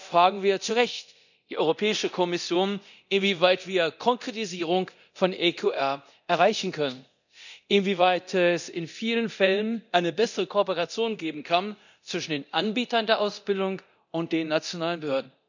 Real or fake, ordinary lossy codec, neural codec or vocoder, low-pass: fake; none; codec, 24 kHz, 0.9 kbps, DualCodec; 7.2 kHz